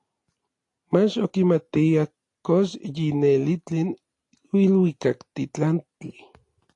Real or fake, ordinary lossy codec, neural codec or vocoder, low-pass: real; AAC, 48 kbps; none; 10.8 kHz